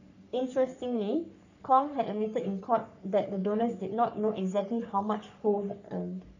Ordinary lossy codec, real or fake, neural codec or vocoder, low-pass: none; fake; codec, 44.1 kHz, 3.4 kbps, Pupu-Codec; 7.2 kHz